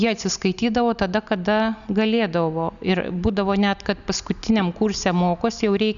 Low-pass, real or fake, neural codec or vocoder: 7.2 kHz; real; none